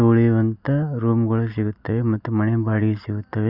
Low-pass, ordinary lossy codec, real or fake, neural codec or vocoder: 5.4 kHz; none; real; none